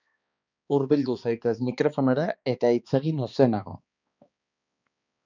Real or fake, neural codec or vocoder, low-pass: fake; codec, 16 kHz, 2 kbps, X-Codec, HuBERT features, trained on balanced general audio; 7.2 kHz